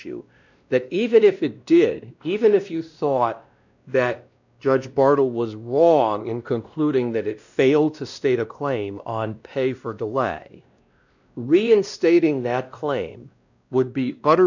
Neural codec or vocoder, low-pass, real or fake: codec, 16 kHz, 1 kbps, X-Codec, WavLM features, trained on Multilingual LibriSpeech; 7.2 kHz; fake